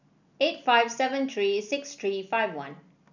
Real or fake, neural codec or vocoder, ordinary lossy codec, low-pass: real; none; none; 7.2 kHz